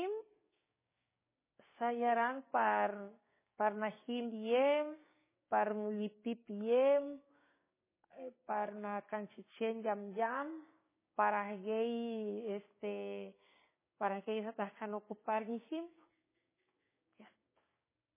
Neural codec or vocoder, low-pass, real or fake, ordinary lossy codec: codec, 16 kHz, 6 kbps, DAC; 3.6 kHz; fake; MP3, 16 kbps